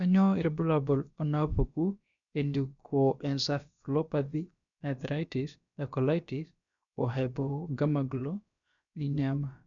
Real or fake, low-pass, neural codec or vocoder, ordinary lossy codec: fake; 7.2 kHz; codec, 16 kHz, about 1 kbps, DyCAST, with the encoder's durations; none